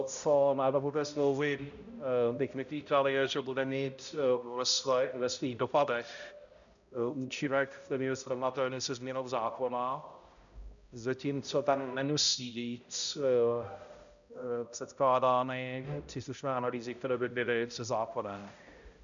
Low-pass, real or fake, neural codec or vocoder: 7.2 kHz; fake; codec, 16 kHz, 0.5 kbps, X-Codec, HuBERT features, trained on balanced general audio